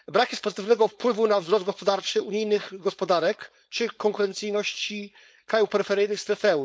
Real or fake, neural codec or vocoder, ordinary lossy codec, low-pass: fake; codec, 16 kHz, 4.8 kbps, FACodec; none; none